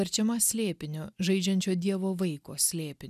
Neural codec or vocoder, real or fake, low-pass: none; real; 14.4 kHz